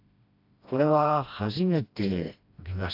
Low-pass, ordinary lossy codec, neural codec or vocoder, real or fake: 5.4 kHz; AAC, 48 kbps; codec, 16 kHz, 1 kbps, FreqCodec, smaller model; fake